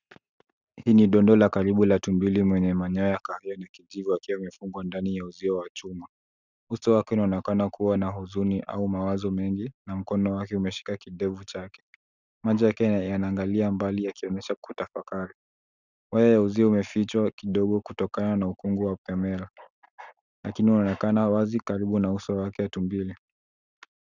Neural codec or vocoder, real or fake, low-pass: none; real; 7.2 kHz